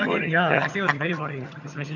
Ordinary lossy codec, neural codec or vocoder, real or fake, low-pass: none; vocoder, 22.05 kHz, 80 mel bands, HiFi-GAN; fake; 7.2 kHz